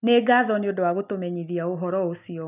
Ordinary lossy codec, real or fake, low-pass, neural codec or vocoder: none; real; 3.6 kHz; none